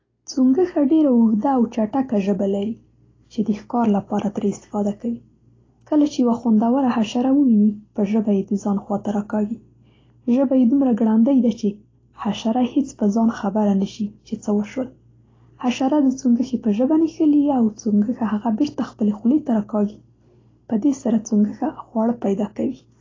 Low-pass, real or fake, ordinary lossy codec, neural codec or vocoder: 7.2 kHz; real; AAC, 32 kbps; none